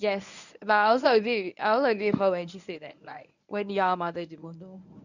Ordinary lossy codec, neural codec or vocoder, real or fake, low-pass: none; codec, 24 kHz, 0.9 kbps, WavTokenizer, medium speech release version 1; fake; 7.2 kHz